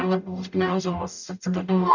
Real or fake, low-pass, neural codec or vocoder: fake; 7.2 kHz; codec, 44.1 kHz, 0.9 kbps, DAC